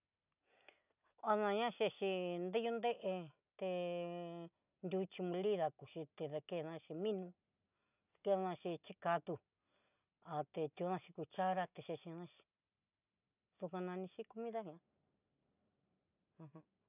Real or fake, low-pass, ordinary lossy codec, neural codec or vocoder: real; 3.6 kHz; none; none